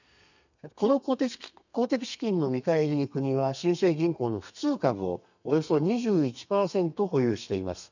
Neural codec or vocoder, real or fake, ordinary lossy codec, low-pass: codec, 32 kHz, 1.9 kbps, SNAC; fake; none; 7.2 kHz